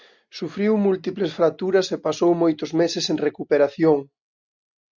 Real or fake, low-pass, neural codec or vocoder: real; 7.2 kHz; none